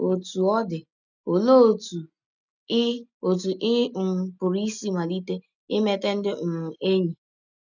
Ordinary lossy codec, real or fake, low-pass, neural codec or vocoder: none; real; 7.2 kHz; none